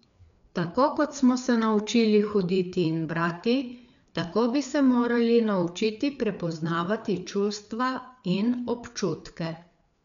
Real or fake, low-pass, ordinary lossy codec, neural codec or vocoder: fake; 7.2 kHz; none; codec, 16 kHz, 4 kbps, FreqCodec, larger model